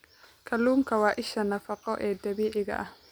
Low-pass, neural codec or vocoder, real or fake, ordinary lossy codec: none; none; real; none